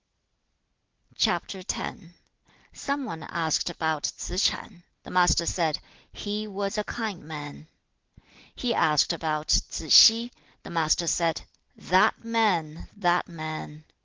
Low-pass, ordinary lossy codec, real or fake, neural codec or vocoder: 7.2 kHz; Opus, 16 kbps; real; none